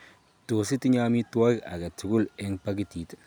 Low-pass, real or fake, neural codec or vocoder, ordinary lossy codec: none; real; none; none